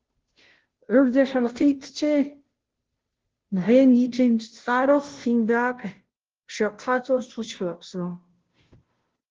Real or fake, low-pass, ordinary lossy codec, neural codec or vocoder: fake; 7.2 kHz; Opus, 16 kbps; codec, 16 kHz, 0.5 kbps, FunCodec, trained on Chinese and English, 25 frames a second